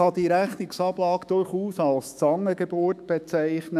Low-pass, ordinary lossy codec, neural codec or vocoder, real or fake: 14.4 kHz; none; autoencoder, 48 kHz, 128 numbers a frame, DAC-VAE, trained on Japanese speech; fake